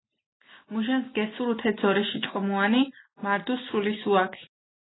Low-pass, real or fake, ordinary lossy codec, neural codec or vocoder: 7.2 kHz; real; AAC, 16 kbps; none